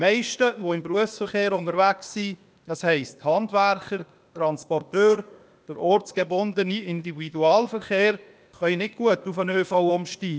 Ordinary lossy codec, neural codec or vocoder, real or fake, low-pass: none; codec, 16 kHz, 0.8 kbps, ZipCodec; fake; none